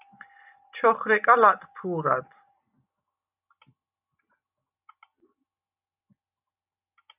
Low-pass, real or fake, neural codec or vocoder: 3.6 kHz; real; none